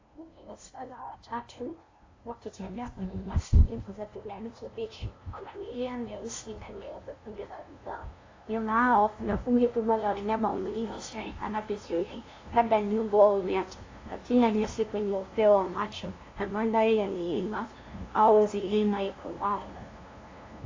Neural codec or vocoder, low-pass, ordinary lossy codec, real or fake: codec, 16 kHz, 0.5 kbps, FunCodec, trained on LibriTTS, 25 frames a second; 7.2 kHz; AAC, 32 kbps; fake